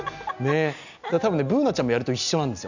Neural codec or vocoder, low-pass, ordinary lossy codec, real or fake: none; 7.2 kHz; none; real